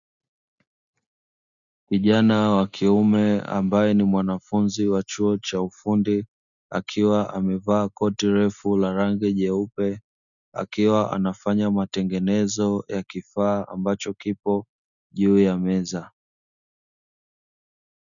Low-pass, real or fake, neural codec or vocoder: 7.2 kHz; real; none